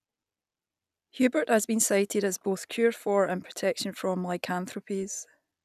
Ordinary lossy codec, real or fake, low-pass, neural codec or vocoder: none; real; 14.4 kHz; none